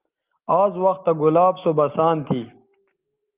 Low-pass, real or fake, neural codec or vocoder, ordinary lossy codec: 3.6 kHz; real; none; Opus, 32 kbps